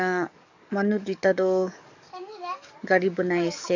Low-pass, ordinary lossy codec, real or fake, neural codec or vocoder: 7.2 kHz; none; fake; codec, 44.1 kHz, 7.8 kbps, DAC